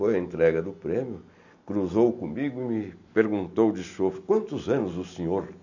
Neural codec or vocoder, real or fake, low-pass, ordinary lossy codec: none; real; 7.2 kHz; none